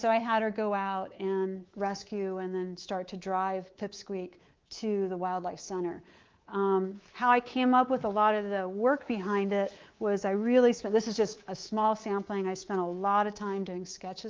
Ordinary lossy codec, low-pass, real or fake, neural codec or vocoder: Opus, 32 kbps; 7.2 kHz; fake; codec, 24 kHz, 3.1 kbps, DualCodec